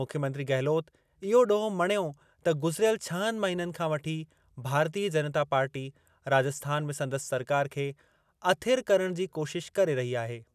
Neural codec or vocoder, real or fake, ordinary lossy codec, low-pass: none; real; none; 14.4 kHz